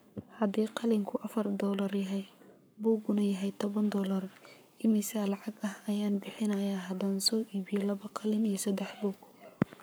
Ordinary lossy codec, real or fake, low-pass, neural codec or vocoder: none; fake; none; codec, 44.1 kHz, 7.8 kbps, Pupu-Codec